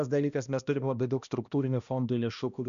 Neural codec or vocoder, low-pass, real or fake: codec, 16 kHz, 1 kbps, X-Codec, HuBERT features, trained on balanced general audio; 7.2 kHz; fake